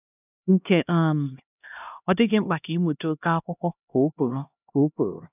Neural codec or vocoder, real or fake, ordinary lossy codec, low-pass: codec, 16 kHz, 1 kbps, X-Codec, HuBERT features, trained on LibriSpeech; fake; none; 3.6 kHz